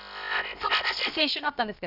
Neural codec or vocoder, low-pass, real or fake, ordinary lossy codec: codec, 16 kHz, about 1 kbps, DyCAST, with the encoder's durations; 5.4 kHz; fake; none